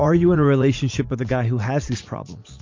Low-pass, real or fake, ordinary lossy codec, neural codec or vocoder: 7.2 kHz; fake; MP3, 48 kbps; vocoder, 44.1 kHz, 80 mel bands, Vocos